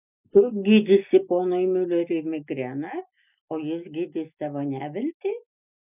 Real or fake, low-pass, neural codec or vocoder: real; 3.6 kHz; none